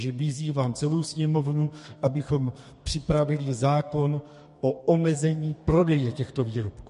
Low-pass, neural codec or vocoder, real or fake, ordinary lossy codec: 14.4 kHz; codec, 32 kHz, 1.9 kbps, SNAC; fake; MP3, 48 kbps